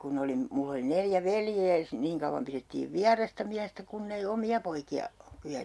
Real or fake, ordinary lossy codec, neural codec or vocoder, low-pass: real; none; none; none